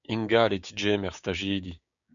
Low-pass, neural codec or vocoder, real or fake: 7.2 kHz; codec, 16 kHz, 6 kbps, DAC; fake